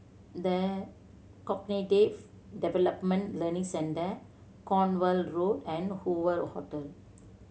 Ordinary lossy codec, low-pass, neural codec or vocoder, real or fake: none; none; none; real